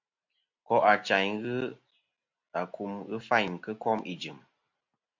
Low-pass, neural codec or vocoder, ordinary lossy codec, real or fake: 7.2 kHz; none; MP3, 48 kbps; real